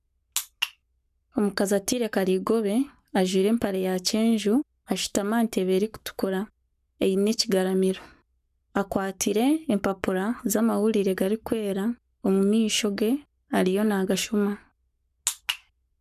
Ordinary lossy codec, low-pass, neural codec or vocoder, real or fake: none; 14.4 kHz; codec, 44.1 kHz, 7.8 kbps, Pupu-Codec; fake